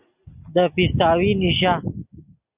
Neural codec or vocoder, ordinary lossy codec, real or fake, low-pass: none; Opus, 64 kbps; real; 3.6 kHz